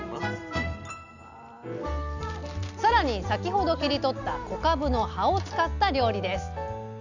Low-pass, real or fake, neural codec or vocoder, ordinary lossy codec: 7.2 kHz; real; none; none